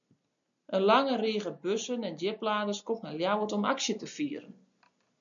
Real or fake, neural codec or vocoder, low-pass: real; none; 7.2 kHz